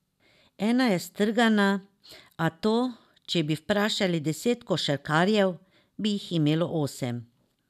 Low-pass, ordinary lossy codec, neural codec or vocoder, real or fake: 14.4 kHz; none; none; real